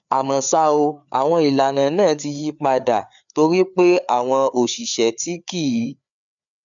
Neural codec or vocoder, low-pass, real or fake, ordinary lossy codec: codec, 16 kHz, 4 kbps, FreqCodec, larger model; 7.2 kHz; fake; none